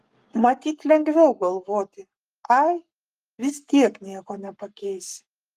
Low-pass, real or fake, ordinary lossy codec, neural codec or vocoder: 14.4 kHz; fake; Opus, 32 kbps; codec, 44.1 kHz, 7.8 kbps, Pupu-Codec